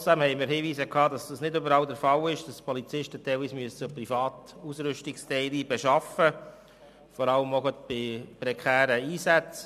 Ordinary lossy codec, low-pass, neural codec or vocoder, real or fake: MP3, 96 kbps; 14.4 kHz; none; real